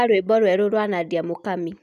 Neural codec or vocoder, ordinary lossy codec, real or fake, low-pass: none; none; real; 14.4 kHz